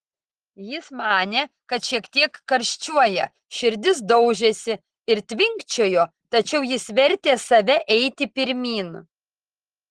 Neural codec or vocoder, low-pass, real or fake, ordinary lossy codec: none; 10.8 kHz; real; Opus, 16 kbps